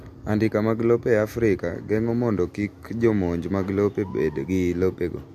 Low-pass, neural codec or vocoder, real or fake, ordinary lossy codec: 14.4 kHz; none; real; MP3, 64 kbps